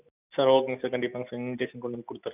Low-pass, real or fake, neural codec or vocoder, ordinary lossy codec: 3.6 kHz; real; none; none